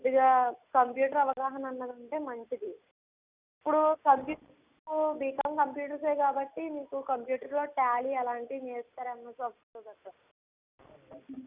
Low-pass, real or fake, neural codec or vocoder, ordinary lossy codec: 3.6 kHz; real; none; Opus, 64 kbps